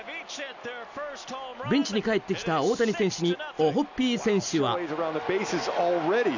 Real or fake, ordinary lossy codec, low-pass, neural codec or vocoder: real; none; 7.2 kHz; none